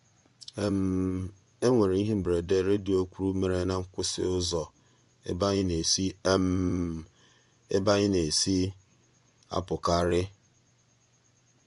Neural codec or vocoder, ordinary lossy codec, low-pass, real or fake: vocoder, 24 kHz, 100 mel bands, Vocos; MP3, 64 kbps; 10.8 kHz; fake